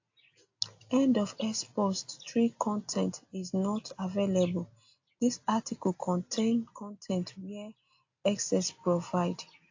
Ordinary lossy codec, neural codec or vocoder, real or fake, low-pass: AAC, 48 kbps; none; real; 7.2 kHz